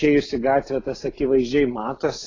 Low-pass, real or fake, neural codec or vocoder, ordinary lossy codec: 7.2 kHz; real; none; AAC, 32 kbps